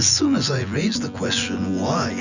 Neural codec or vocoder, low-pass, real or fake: vocoder, 24 kHz, 100 mel bands, Vocos; 7.2 kHz; fake